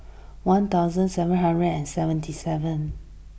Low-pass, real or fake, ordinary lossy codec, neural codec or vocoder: none; real; none; none